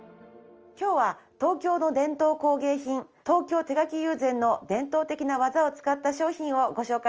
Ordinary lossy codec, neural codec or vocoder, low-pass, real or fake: Opus, 24 kbps; none; 7.2 kHz; real